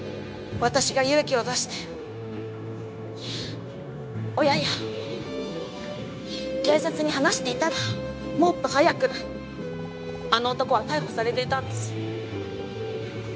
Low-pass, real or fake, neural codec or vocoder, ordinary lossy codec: none; fake; codec, 16 kHz, 0.9 kbps, LongCat-Audio-Codec; none